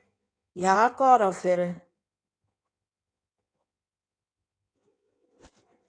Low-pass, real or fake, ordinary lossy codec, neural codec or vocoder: 9.9 kHz; fake; AAC, 48 kbps; codec, 16 kHz in and 24 kHz out, 1.1 kbps, FireRedTTS-2 codec